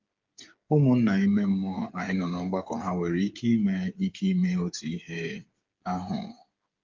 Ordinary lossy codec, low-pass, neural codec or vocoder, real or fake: Opus, 24 kbps; 7.2 kHz; codec, 16 kHz, 8 kbps, FreqCodec, smaller model; fake